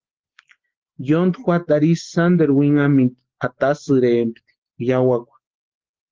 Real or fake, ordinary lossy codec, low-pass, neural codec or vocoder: real; Opus, 16 kbps; 7.2 kHz; none